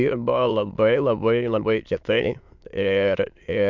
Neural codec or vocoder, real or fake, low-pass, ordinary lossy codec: autoencoder, 22.05 kHz, a latent of 192 numbers a frame, VITS, trained on many speakers; fake; 7.2 kHz; MP3, 64 kbps